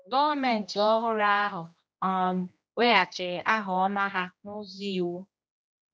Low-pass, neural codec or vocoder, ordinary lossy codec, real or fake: none; codec, 16 kHz, 1 kbps, X-Codec, HuBERT features, trained on general audio; none; fake